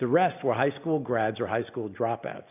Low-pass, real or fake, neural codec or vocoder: 3.6 kHz; real; none